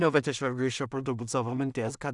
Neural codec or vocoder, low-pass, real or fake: codec, 16 kHz in and 24 kHz out, 0.4 kbps, LongCat-Audio-Codec, two codebook decoder; 10.8 kHz; fake